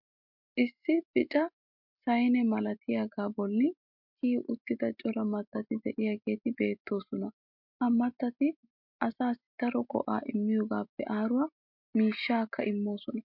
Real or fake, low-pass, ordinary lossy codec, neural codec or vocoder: real; 5.4 kHz; MP3, 48 kbps; none